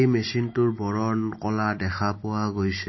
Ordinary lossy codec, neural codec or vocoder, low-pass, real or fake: MP3, 24 kbps; none; 7.2 kHz; real